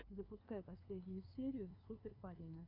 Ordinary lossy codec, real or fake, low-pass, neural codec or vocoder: AAC, 24 kbps; fake; 5.4 kHz; codec, 16 kHz, 2 kbps, FunCodec, trained on LibriTTS, 25 frames a second